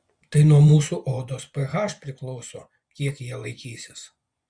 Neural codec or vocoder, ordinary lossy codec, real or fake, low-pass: vocoder, 24 kHz, 100 mel bands, Vocos; Opus, 64 kbps; fake; 9.9 kHz